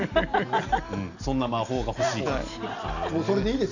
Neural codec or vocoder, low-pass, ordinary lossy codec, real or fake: none; 7.2 kHz; none; real